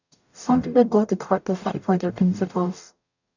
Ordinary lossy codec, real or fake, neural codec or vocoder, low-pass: none; fake; codec, 44.1 kHz, 0.9 kbps, DAC; 7.2 kHz